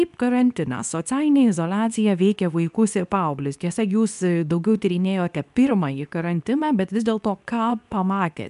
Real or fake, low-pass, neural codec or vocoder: fake; 10.8 kHz; codec, 24 kHz, 0.9 kbps, WavTokenizer, medium speech release version 2